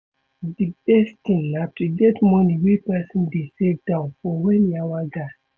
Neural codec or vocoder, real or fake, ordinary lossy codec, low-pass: none; real; none; none